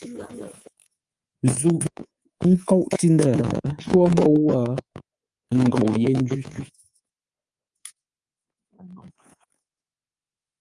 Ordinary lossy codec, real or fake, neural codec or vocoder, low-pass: Opus, 32 kbps; fake; codec, 24 kHz, 3.1 kbps, DualCodec; 10.8 kHz